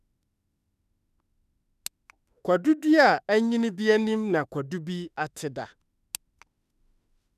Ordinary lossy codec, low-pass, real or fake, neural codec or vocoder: none; 14.4 kHz; fake; autoencoder, 48 kHz, 32 numbers a frame, DAC-VAE, trained on Japanese speech